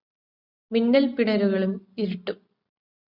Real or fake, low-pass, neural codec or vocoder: real; 5.4 kHz; none